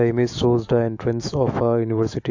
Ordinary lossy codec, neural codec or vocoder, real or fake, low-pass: AAC, 48 kbps; autoencoder, 48 kHz, 128 numbers a frame, DAC-VAE, trained on Japanese speech; fake; 7.2 kHz